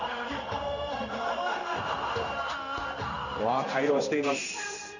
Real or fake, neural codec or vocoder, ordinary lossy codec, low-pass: fake; codec, 16 kHz in and 24 kHz out, 1 kbps, XY-Tokenizer; none; 7.2 kHz